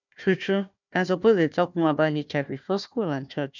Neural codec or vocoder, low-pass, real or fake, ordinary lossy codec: codec, 16 kHz, 1 kbps, FunCodec, trained on Chinese and English, 50 frames a second; 7.2 kHz; fake; none